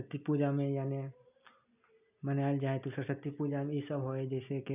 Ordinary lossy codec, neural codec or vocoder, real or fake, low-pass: none; none; real; 3.6 kHz